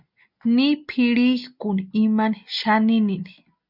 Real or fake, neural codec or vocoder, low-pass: real; none; 5.4 kHz